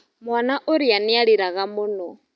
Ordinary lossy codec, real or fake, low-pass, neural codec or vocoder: none; real; none; none